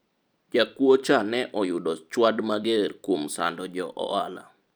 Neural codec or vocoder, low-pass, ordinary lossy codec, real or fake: vocoder, 44.1 kHz, 128 mel bands every 256 samples, BigVGAN v2; none; none; fake